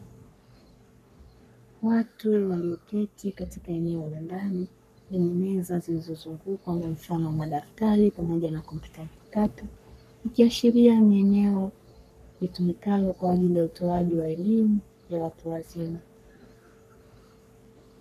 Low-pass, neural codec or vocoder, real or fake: 14.4 kHz; codec, 44.1 kHz, 3.4 kbps, Pupu-Codec; fake